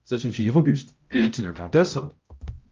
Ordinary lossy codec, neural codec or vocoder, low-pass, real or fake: Opus, 32 kbps; codec, 16 kHz, 0.5 kbps, X-Codec, HuBERT features, trained on balanced general audio; 7.2 kHz; fake